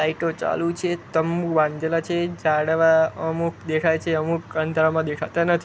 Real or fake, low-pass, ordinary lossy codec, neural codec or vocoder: real; none; none; none